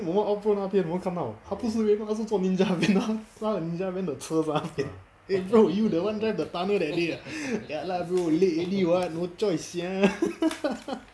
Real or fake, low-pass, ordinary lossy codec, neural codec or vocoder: real; none; none; none